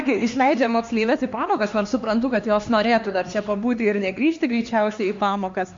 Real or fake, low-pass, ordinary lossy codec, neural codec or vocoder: fake; 7.2 kHz; MP3, 48 kbps; codec, 16 kHz, 2 kbps, X-Codec, HuBERT features, trained on LibriSpeech